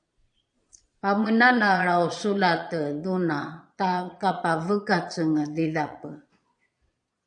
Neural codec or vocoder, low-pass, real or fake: vocoder, 22.05 kHz, 80 mel bands, Vocos; 9.9 kHz; fake